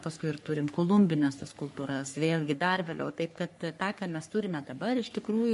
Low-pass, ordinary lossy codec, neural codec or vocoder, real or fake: 14.4 kHz; MP3, 48 kbps; codec, 44.1 kHz, 3.4 kbps, Pupu-Codec; fake